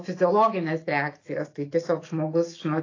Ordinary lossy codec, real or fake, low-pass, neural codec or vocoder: AAC, 32 kbps; fake; 7.2 kHz; autoencoder, 48 kHz, 128 numbers a frame, DAC-VAE, trained on Japanese speech